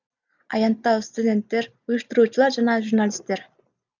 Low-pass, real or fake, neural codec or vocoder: 7.2 kHz; real; none